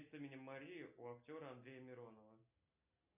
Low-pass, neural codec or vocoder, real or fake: 3.6 kHz; none; real